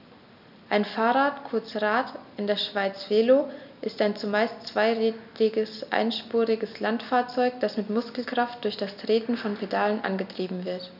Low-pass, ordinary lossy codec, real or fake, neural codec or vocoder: 5.4 kHz; none; real; none